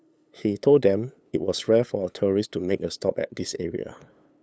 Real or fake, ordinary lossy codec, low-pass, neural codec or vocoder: fake; none; none; codec, 16 kHz, 8 kbps, FunCodec, trained on LibriTTS, 25 frames a second